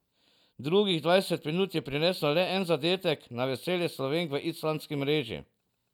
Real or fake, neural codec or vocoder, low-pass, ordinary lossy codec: real; none; 19.8 kHz; none